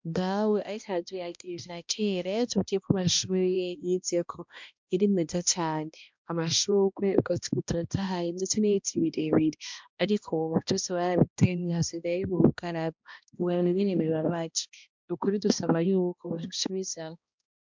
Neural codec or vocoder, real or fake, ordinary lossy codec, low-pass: codec, 16 kHz, 1 kbps, X-Codec, HuBERT features, trained on balanced general audio; fake; MP3, 64 kbps; 7.2 kHz